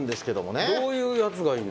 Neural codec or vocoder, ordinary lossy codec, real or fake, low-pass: none; none; real; none